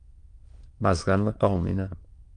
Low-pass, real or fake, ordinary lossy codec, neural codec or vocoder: 9.9 kHz; fake; Opus, 32 kbps; autoencoder, 22.05 kHz, a latent of 192 numbers a frame, VITS, trained on many speakers